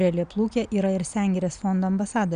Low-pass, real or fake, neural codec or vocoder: 9.9 kHz; real; none